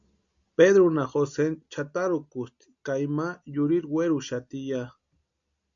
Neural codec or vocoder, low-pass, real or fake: none; 7.2 kHz; real